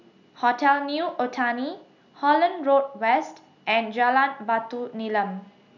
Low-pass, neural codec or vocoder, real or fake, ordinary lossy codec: 7.2 kHz; none; real; none